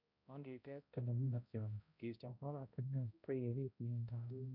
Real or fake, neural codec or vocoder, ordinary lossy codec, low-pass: fake; codec, 16 kHz, 0.5 kbps, X-Codec, HuBERT features, trained on balanced general audio; none; 5.4 kHz